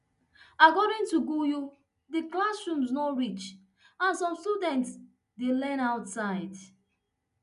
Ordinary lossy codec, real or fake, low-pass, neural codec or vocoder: none; real; 10.8 kHz; none